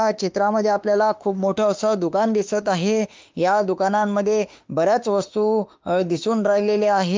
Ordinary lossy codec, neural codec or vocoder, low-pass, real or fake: Opus, 32 kbps; autoencoder, 48 kHz, 32 numbers a frame, DAC-VAE, trained on Japanese speech; 7.2 kHz; fake